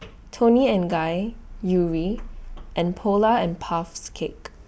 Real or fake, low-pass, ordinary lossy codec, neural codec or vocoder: real; none; none; none